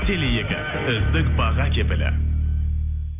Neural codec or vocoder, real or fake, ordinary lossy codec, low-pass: none; real; none; 3.6 kHz